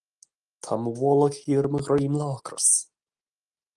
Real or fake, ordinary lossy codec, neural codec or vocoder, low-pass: real; Opus, 24 kbps; none; 10.8 kHz